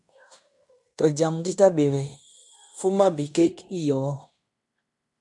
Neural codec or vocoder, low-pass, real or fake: codec, 16 kHz in and 24 kHz out, 0.9 kbps, LongCat-Audio-Codec, fine tuned four codebook decoder; 10.8 kHz; fake